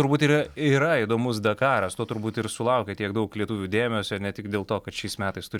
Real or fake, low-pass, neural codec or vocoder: real; 19.8 kHz; none